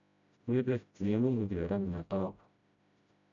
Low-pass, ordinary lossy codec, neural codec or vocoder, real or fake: 7.2 kHz; none; codec, 16 kHz, 0.5 kbps, FreqCodec, smaller model; fake